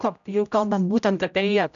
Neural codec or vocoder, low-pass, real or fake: codec, 16 kHz, 0.5 kbps, X-Codec, HuBERT features, trained on general audio; 7.2 kHz; fake